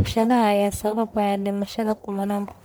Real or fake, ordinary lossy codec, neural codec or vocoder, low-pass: fake; none; codec, 44.1 kHz, 1.7 kbps, Pupu-Codec; none